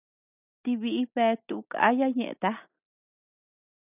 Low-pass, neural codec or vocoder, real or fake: 3.6 kHz; none; real